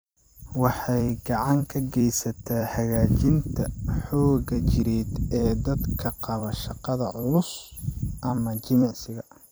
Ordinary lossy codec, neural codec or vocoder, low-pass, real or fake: none; vocoder, 44.1 kHz, 128 mel bands every 256 samples, BigVGAN v2; none; fake